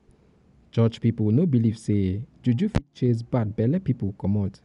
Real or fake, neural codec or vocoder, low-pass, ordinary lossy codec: real; none; 10.8 kHz; MP3, 96 kbps